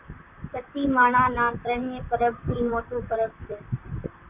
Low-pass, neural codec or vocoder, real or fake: 3.6 kHz; vocoder, 44.1 kHz, 128 mel bands, Pupu-Vocoder; fake